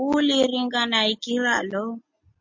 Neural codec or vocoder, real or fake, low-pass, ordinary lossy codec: none; real; 7.2 kHz; MP3, 48 kbps